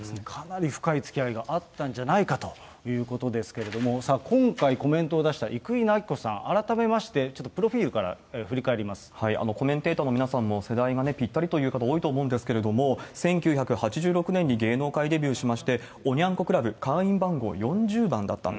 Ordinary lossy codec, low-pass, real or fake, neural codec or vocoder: none; none; real; none